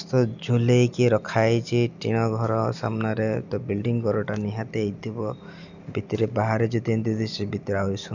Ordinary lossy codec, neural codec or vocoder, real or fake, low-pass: none; none; real; 7.2 kHz